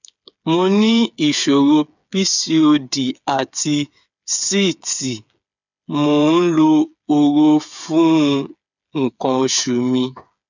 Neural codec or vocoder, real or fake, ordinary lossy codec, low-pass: codec, 16 kHz, 8 kbps, FreqCodec, smaller model; fake; none; 7.2 kHz